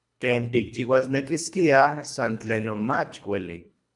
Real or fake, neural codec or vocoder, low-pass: fake; codec, 24 kHz, 1.5 kbps, HILCodec; 10.8 kHz